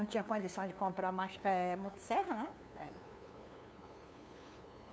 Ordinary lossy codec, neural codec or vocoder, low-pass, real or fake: none; codec, 16 kHz, 2 kbps, FunCodec, trained on LibriTTS, 25 frames a second; none; fake